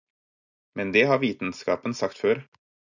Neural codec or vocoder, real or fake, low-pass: none; real; 7.2 kHz